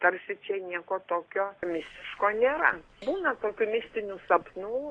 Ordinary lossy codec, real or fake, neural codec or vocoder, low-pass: AAC, 32 kbps; real; none; 9.9 kHz